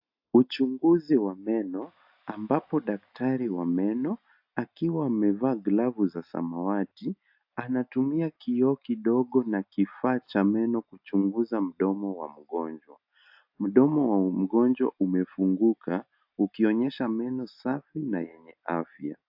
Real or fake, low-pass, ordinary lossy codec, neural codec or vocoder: real; 5.4 kHz; AAC, 48 kbps; none